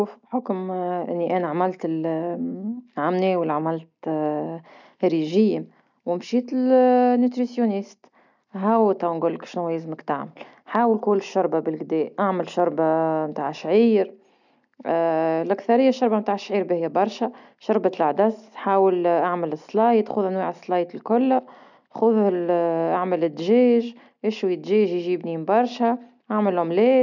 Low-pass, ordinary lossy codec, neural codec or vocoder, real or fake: 7.2 kHz; none; none; real